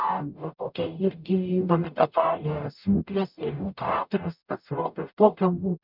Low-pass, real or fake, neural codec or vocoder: 5.4 kHz; fake; codec, 44.1 kHz, 0.9 kbps, DAC